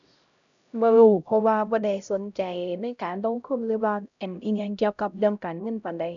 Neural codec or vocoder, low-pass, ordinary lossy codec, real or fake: codec, 16 kHz, 0.5 kbps, X-Codec, HuBERT features, trained on LibriSpeech; 7.2 kHz; none; fake